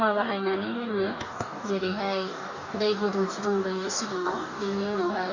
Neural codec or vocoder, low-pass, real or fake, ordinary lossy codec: codec, 44.1 kHz, 2.6 kbps, DAC; 7.2 kHz; fake; none